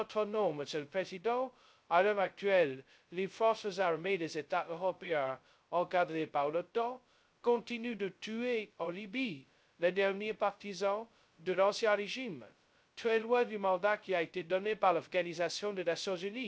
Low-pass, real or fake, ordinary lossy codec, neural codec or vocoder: none; fake; none; codec, 16 kHz, 0.2 kbps, FocalCodec